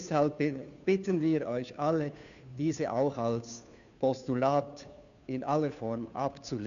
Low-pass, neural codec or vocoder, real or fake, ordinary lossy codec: 7.2 kHz; codec, 16 kHz, 2 kbps, FunCodec, trained on Chinese and English, 25 frames a second; fake; none